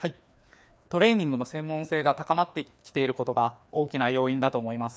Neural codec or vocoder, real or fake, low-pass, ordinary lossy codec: codec, 16 kHz, 2 kbps, FreqCodec, larger model; fake; none; none